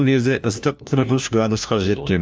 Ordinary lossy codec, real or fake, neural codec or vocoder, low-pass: none; fake; codec, 16 kHz, 1 kbps, FunCodec, trained on LibriTTS, 50 frames a second; none